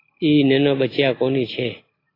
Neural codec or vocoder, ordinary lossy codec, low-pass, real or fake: none; AAC, 24 kbps; 5.4 kHz; real